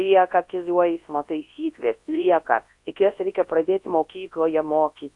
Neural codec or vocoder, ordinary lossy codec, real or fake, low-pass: codec, 24 kHz, 0.9 kbps, WavTokenizer, large speech release; AAC, 48 kbps; fake; 10.8 kHz